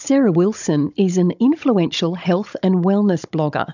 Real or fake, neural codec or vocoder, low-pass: fake; codec, 16 kHz, 16 kbps, FunCodec, trained on Chinese and English, 50 frames a second; 7.2 kHz